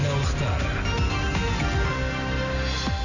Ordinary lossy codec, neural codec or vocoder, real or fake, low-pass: none; none; real; 7.2 kHz